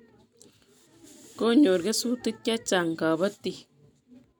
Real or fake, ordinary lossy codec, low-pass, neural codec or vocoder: real; none; none; none